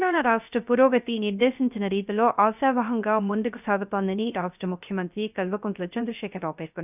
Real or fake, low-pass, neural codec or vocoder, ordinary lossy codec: fake; 3.6 kHz; codec, 16 kHz, 0.3 kbps, FocalCodec; none